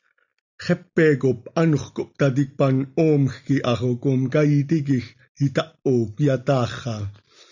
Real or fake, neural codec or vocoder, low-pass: real; none; 7.2 kHz